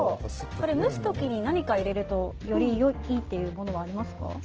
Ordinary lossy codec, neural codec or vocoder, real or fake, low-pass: Opus, 16 kbps; none; real; 7.2 kHz